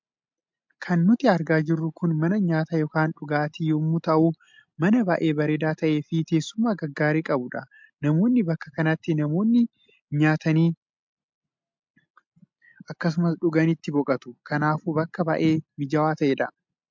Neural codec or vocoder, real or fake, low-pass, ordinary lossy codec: none; real; 7.2 kHz; MP3, 64 kbps